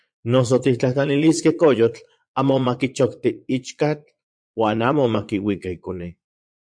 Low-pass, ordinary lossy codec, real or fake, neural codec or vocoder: 9.9 kHz; MP3, 64 kbps; fake; vocoder, 22.05 kHz, 80 mel bands, Vocos